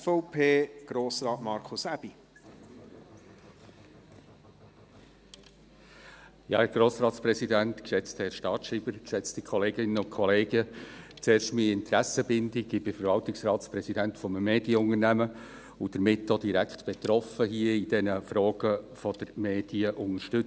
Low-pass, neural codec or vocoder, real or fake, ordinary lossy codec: none; none; real; none